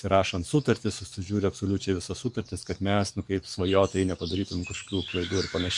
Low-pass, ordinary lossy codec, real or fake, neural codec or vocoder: 10.8 kHz; MP3, 64 kbps; fake; codec, 44.1 kHz, 7.8 kbps, DAC